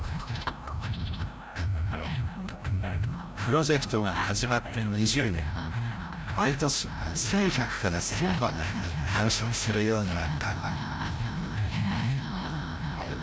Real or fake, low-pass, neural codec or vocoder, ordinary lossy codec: fake; none; codec, 16 kHz, 0.5 kbps, FreqCodec, larger model; none